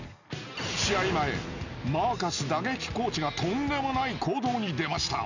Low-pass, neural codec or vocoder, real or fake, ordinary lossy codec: 7.2 kHz; none; real; none